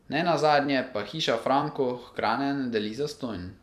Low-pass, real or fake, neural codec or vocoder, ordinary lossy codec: 14.4 kHz; fake; vocoder, 44.1 kHz, 128 mel bands every 256 samples, BigVGAN v2; none